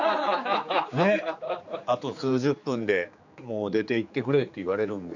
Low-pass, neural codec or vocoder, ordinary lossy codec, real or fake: 7.2 kHz; codec, 16 kHz, 4 kbps, X-Codec, HuBERT features, trained on general audio; none; fake